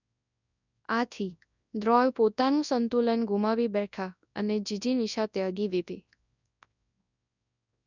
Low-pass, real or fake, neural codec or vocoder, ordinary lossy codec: 7.2 kHz; fake; codec, 24 kHz, 0.9 kbps, WavTokenizer, large speech release; none